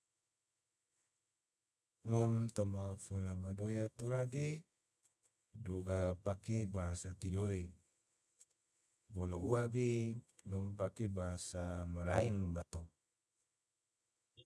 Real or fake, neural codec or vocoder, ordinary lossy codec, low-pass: fake; codec, 24 kHz, 0.9 kbps, WavTokenizer, medium music audio release; none; none